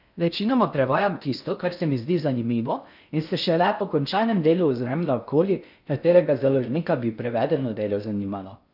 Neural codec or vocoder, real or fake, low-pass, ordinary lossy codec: codec, 16 kHz in and 24 kHz out, 0.6 kbps, FocalCodec, streaming, 2048 codes; fake; 5.4 kHz; none